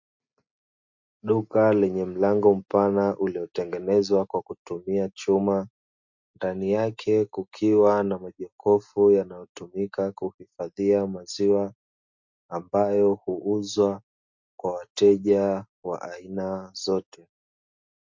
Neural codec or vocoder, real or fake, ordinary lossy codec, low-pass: none; real; MP3, 48 kbps; 7.2 kHz